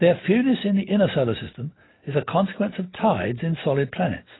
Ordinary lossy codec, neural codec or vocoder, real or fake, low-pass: AAC, 16 kbps; none; real; 7.2 kHz